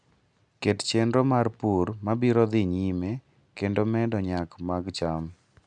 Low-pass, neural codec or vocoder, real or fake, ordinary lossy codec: 9.9 kHz; none; real; none